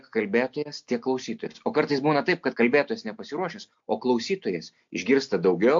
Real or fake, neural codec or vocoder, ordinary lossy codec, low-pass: real; none; MP3, 48 kbps; 7.2 kHz